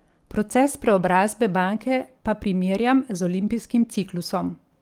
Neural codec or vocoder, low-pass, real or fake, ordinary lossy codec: codec, 44.1 kHz, 7.8 kbps, DAC; 19.8 kHz; fake; Opus, 24 kbps